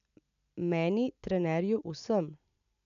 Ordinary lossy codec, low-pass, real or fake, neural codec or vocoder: AAC, 96 kbps; 7.2 kHz; real; none